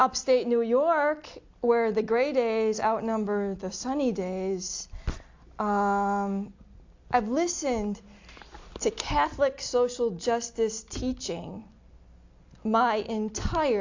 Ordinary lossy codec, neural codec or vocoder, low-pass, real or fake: AAC, 48 kbps; none; 7.2 kHz; real